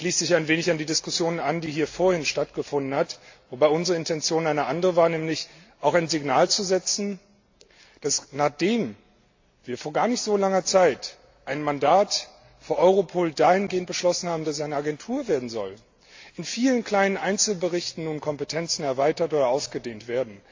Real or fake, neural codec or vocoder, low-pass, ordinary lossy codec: real; none; 7.2 kHz; AAC, 48 kbps